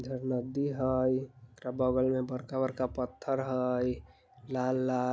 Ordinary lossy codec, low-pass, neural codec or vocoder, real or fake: none; none; none; real